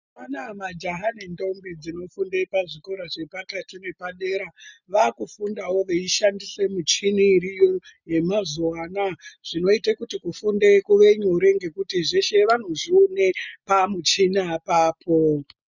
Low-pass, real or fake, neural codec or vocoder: 7.2 kHz; real; none